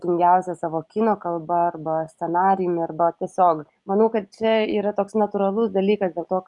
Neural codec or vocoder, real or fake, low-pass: none; real; 10.8 kHz